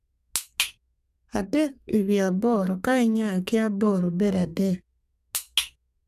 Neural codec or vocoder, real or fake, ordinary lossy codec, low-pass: codec, 32 kHz, 1.9 kbps, SNAC; fake; none; 14.4 kHz